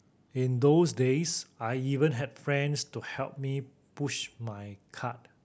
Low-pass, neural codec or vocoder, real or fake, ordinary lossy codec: none; none; real; none